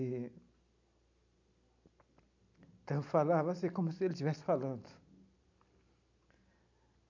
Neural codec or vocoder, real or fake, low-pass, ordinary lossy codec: none; real; 7.2 kHz; none